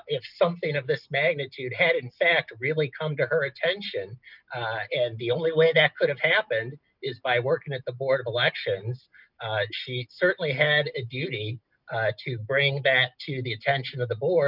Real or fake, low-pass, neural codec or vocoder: real; 5.4 kHz; none